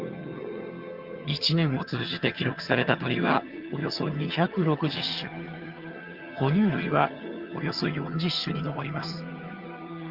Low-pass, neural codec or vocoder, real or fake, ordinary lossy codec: 5.4 kHz; vocoder, 22.05 kHz, 80 mel bands, HiFi-GAN; fake; Opus, 32 kbps